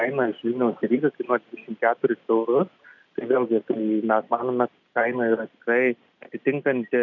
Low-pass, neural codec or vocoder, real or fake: 7.2 kHz; none; real